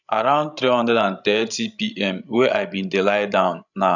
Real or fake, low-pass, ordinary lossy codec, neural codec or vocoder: fake; 7.2 kHz; none; codec, 16 kHz, 16 kbps, FreqCodec, larger model